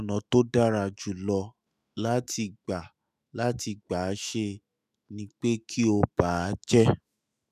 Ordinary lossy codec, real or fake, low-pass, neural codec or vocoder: none; fake; 14.4 kHz; autoencoder, 48 kHz, 128 numbers a frame, DAC-VAE, trained on Japanese speech